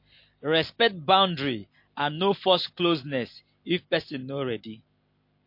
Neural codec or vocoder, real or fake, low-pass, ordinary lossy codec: none; real; 5.4 kHz; MP3, 32 kbps